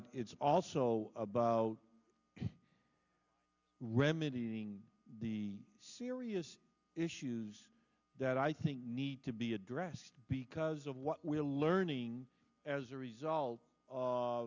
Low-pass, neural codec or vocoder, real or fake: 7.2 kHz; none; real